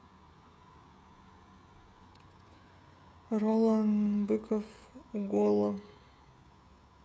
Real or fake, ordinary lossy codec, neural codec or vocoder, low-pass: fake; none; codec, 16 kHz, 16 kbps, FreqCodec, smaller model; none